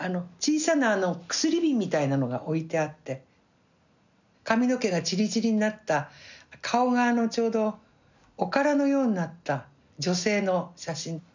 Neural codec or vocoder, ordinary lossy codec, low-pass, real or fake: none; none; 7.2 kHz; real